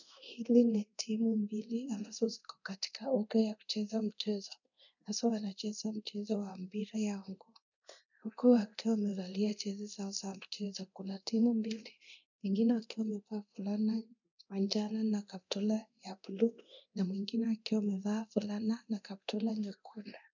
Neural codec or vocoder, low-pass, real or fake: codec, 24 kHz, 0.9 kbps, DualCodec; 7.2 kHz; fake